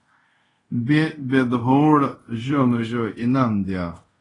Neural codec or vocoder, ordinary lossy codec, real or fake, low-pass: codec, 24 kHz, 0.5 kbps, DualCodec; AAC, 32 kbps; fake; 10.8 kHz